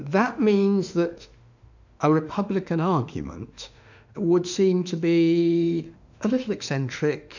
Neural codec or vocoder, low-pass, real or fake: autoencoder, 48 kHz, 32 numbers a frame, DAC-VAE, trained on Japanese speech; 7.2 kHz; fake